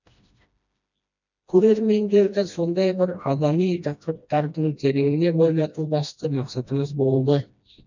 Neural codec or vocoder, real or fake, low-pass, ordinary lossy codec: codec, 16 kHz, 1 kbps, FreqCodec, smaller model; fake; 7.2 kHz; none